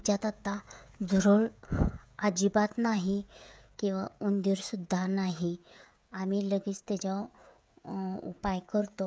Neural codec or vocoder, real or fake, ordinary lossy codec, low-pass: codec, 16 kHz, 16 kbps, FreqCodec, smaller model; fake; none; none